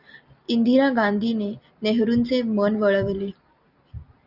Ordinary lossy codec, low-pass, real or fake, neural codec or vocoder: Opus, 64 kbps; 5.4 kHz; real; none